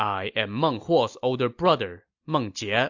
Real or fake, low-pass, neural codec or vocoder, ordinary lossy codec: real; 7.2 kHz; none; AAC, 48 kbps